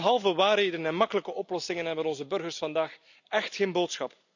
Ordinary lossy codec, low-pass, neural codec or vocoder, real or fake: none; 7.2 kHz; none; real